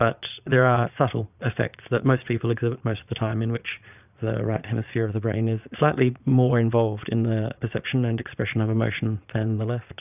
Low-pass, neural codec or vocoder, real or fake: 3.6 kHz; vocoder, 22.05 kHz, 80 mel bands, Vocos; fake